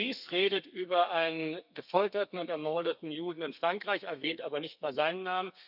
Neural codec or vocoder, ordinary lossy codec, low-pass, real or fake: codec, 32 kHz, 1.9 kbps, SNAC; none; 5.4 kHz; fake